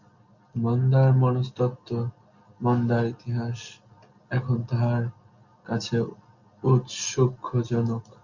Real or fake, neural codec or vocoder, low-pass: real; none; 7.2 kHz